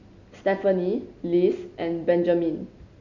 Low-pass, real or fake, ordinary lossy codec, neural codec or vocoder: 7.2 kHz; real; none; none